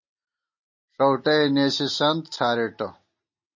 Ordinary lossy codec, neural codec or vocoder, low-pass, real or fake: MP3, 32 kbps; none; 7.2 kHz; real